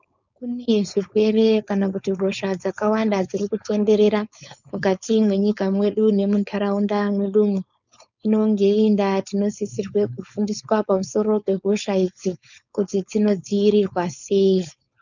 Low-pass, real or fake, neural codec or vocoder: 7.2 kHz; fake; codec, 16 kHz, 4.8 kbps, FACodec